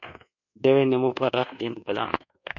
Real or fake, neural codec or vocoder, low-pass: fake; codec, 24 kHz, 1.2 kbps, DualCodec; 7.2 kHz